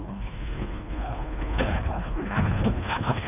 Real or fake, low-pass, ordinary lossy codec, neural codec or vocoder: fake; 3.6 kHz; none; codec, 24 kHz, 1.5 kbps, HILCodec